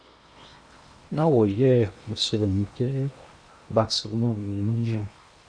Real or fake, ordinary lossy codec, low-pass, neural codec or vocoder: fake; Opus, 64 kbps; 9.9 kHz; codec, 16 kHz in and 24 kHz out, 0.8 kbps, FocalCodec, streaming, 65536 codes